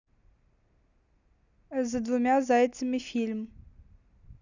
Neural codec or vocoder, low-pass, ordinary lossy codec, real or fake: none; 7.2 kHz; none; real